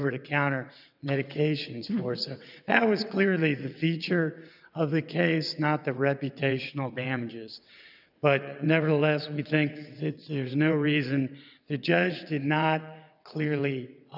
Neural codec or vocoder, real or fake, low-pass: vocoder, 22.05 kHz, 80 mel bands, WaveNeXt; fake; 5.4 kHz